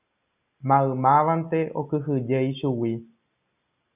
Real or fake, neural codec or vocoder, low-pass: real; none; 3.6 kHz